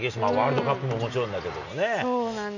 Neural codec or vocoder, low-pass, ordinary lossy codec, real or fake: none; 7.2 kHz; none; real